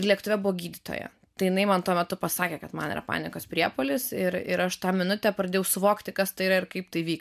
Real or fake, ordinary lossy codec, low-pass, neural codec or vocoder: real; MP3, 96 kbps; 14.4 kHz; none